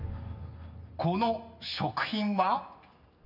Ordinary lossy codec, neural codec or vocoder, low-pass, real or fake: none; none; 5.4 kHz; real